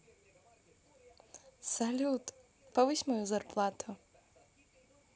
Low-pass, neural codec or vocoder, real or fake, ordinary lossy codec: none; none; real; none